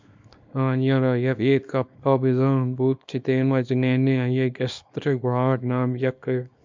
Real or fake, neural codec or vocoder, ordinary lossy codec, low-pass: fake; codec, 24 kHz, 0.9 kbps, WavTokenizer, small release; MP3, 64 kbps; 7.2 kHz